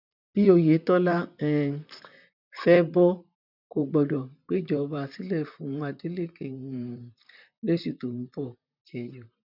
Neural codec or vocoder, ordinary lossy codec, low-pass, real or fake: vocoder, 44.1 kHz, 128 mel bands, Pupu-Vocoder; none; 5.4 kHz; fake